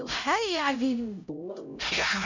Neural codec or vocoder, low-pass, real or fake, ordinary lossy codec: codec, 16 kHz, 0.5 kbps, X-Codec, HuBERT features, trained on LibriSpeech; 7.2 kHz; fake; none